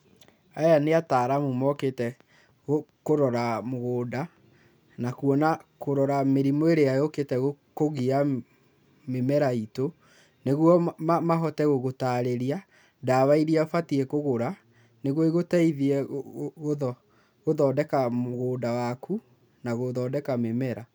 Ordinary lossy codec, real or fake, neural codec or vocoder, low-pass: none; real; none; none